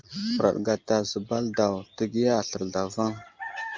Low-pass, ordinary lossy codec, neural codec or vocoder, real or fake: 7.2 kHz; Opus, 24 kbps; none; real